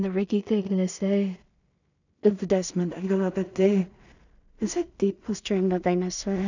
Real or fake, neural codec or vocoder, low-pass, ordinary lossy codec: fake; codec, 16 kHz in and 24 kHz out, 0.4 kbps, LongCat-Audio-Codec, two codebook decoder; 7.2 kHz; none